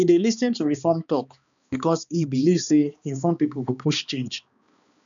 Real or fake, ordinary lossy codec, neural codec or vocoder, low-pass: fake; none; codec, 16 kHz, 2 kbps, X-Codec, HuBERT features, trained on balanced general audio; 7.2 kHz